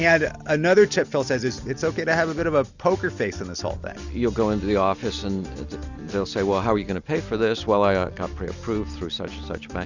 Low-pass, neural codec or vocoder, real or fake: 7.2 kHz; none; real